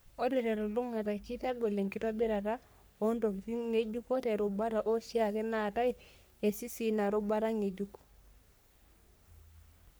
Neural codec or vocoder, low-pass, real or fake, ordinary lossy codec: codec, 44.1 kHz, 3.4 kbps, Pupu-Codec; none; fake; none